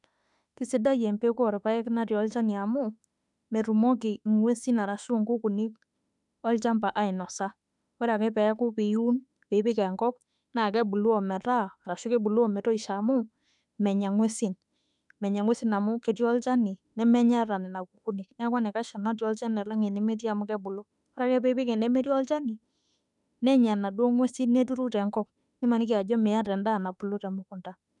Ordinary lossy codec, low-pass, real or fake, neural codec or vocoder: none; 10.8 kHz; fake; autoencoder, 48 kHz, 32 numbers a frame, DAC-VAE, trained on Japanese speech